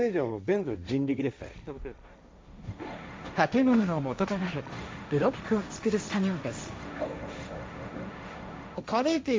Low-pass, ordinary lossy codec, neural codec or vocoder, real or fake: none; none; codec, 16 kHz, 1.1 kbps, Voila-Tokenizer; fake